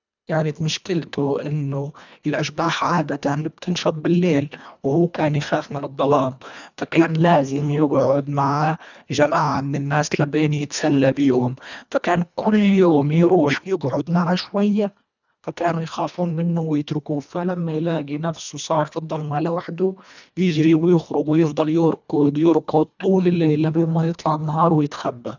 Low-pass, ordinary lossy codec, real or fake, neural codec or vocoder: 7.2 kHz; none; fake; codec, 24 kHz, 1.5 kbps, HILCodec